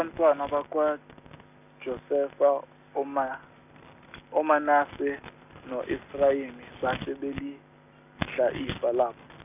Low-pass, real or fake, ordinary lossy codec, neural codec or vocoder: 3.6 kHz; real; none; none